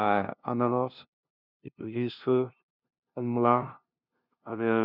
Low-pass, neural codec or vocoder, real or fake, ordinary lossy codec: 5.4 kHz; codec, 16 kHz, 0.5 kbps, FunCodec, trained on LibriTTS, 25 frames a second; fake; none